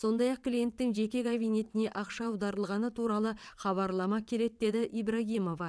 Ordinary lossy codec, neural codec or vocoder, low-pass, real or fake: none; vocoder, 22.05 kHz, 80 mel bands, WaveNeXt; none; fake